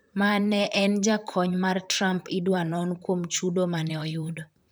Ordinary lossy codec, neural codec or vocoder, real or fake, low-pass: none; vocoder, 44.1 kHz, 128 mel bands, Pupu-Vocoder; fake; none